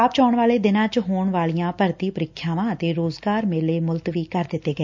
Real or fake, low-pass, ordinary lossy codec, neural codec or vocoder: real; 7.2 kHz; MP3, 64 kbps; none